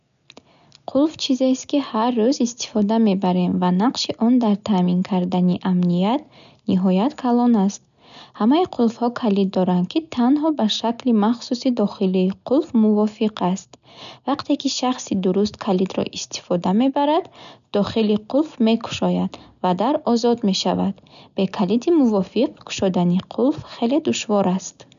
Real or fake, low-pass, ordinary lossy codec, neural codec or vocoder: real; 7.2 kHz; none; none